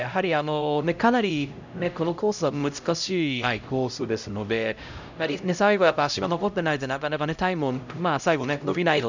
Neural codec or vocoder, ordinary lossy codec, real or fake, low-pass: codec, 16 kHz, 0.5 kbps, X-Codec, HuBERT features, trained on LibriSpeech; none; fake; 7.2 kHz